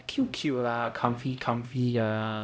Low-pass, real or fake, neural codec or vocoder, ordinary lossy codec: none; fake; codec, 16 kHz, 0.5 kbps, X-Codec, HuBERT features, trained on LibriSpeech; none